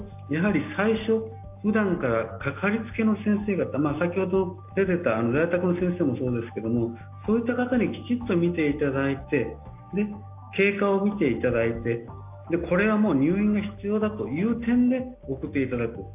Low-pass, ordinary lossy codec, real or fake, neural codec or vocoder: 3.6 kHz; AAC, 32 kbps; real; none